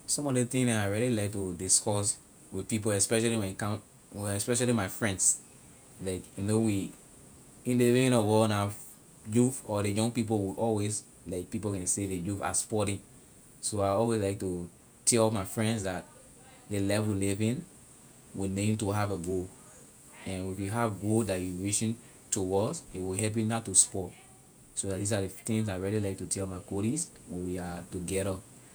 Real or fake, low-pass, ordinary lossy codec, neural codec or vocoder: fake; none; none; vocoder, 48 kHz, 128 mel bands, Vocos